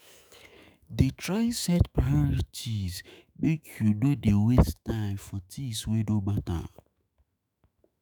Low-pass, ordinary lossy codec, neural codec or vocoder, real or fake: none; none; autoencoder, 48 kHz, 128 numbers a frame, DAC-VAE, trained on Japanese speech; fake